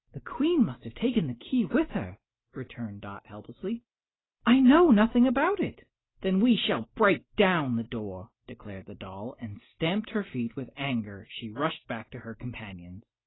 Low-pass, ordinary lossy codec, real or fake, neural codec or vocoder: 7.2 kHz; AAC, 16 kbps; real; none